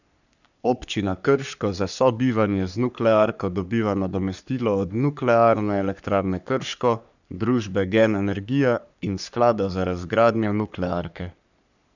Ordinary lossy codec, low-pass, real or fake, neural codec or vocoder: none; 7.2 kHz; fake; codec, 44.1 kHz, 3.4 kbps, Pupu-Codec